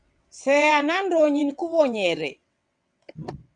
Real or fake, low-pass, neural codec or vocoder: fake; 9.9 kHz; vocoder, 22.05 kHz, 80 mel bands, WaveNeXt